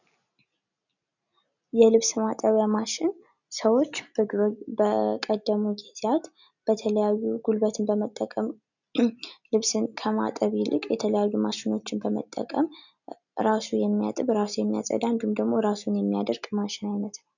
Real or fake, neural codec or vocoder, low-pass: real; none; 7.2 kHz